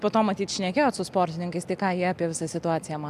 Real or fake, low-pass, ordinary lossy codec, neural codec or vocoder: real; 14.4 kHz; MP3, 96 kbps; none